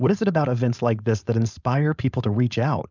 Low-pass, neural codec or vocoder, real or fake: 7.2 kHz; codec, 16 kHz, 4.8 kbps, FACodec; fake